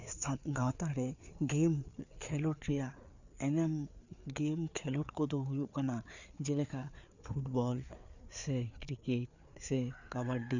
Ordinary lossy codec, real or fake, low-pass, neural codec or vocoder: none; fake; 7.2 kHz; codec, 16 kHz, 4 kbps, FreqCodec, larger model